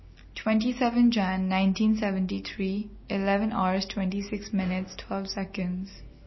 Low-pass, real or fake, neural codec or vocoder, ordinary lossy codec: 7.2 kHz; real; none; MP3, 24 kbps